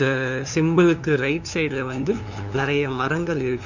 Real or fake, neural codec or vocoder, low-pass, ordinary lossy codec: fake; codec, 16 kHz, 2 kbps, FunCodec, trained on LibriTTS, 25 frames a second; 7.2 kHz; none